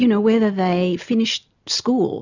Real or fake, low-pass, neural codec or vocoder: real; 7.2 kHz; none